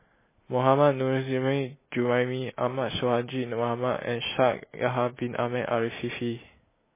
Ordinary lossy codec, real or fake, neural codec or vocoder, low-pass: MP3, 16 kbps; real; none; 3.6 kHz